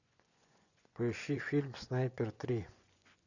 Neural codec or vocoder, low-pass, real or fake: vocoder, 24 kHz, 100 mel bands, Vocos; 7.2 kHz; fake